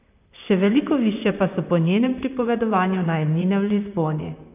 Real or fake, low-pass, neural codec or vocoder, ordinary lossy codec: fake; 3.6 kHz; vocoder, 44.1 kHz, 128 mel bands, Pupu-Vocoder; none